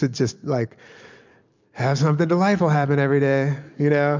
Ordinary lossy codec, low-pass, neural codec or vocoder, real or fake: MP3, 64 kbps; 7.2 kHz; none; real